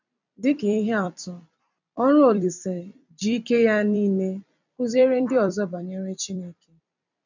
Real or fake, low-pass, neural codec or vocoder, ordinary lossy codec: fake; 7.2 kHz; vocoder, 44.1 kHz, 128 mel bands every 256 samples, BigVGAN v2; none